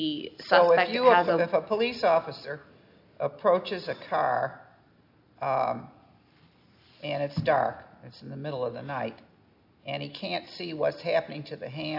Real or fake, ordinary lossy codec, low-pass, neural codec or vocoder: real; AAC, 48 kbps; 5.4 kHz; none